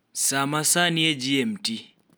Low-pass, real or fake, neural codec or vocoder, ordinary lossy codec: none; real; none; none